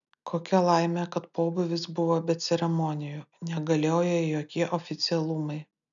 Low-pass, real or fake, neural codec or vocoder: 7.2 kHz; real; none